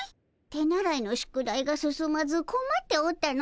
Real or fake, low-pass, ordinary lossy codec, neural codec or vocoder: real; none; none; none